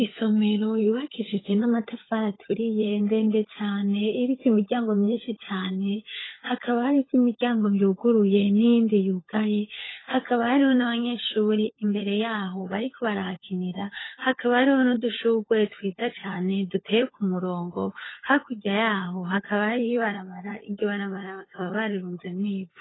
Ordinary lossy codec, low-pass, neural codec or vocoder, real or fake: AAC, 16 kbps; 7.2 kHz; codec, 16 kHz, 16 kbps, FunCodec, trained on LibriTTS, 50 frames a second; fake